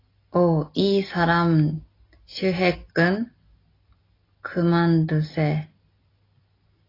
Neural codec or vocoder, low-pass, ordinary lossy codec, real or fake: none; 5.4 kHz; AAC, 24 kbps; real